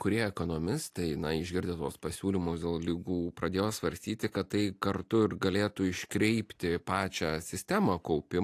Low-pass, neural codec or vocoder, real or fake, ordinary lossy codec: 14.4 kHz; none; real; AAC, 64 kbps